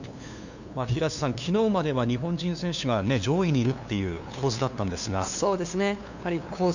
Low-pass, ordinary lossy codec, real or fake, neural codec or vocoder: 7.2 kHz; none; fake; codec, 16 kHz, 2 kbps, FunCodec, trained on LibriTTS, 25 frames a second